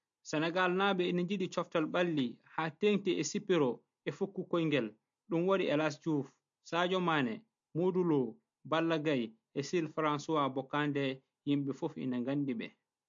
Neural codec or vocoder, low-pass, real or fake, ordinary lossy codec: none; 7.2 kHz; real; MP3, 48 kbps